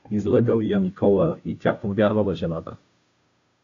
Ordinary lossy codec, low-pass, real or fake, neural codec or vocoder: AAC, 48 kbps; 7.2 kHz; fake; codec, 16 kHz, 0.5 kbps, FunCodec, trained on Chinese and English, 25 frames a second